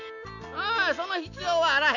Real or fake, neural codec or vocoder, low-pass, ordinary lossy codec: real; none; 7.2 kHz; none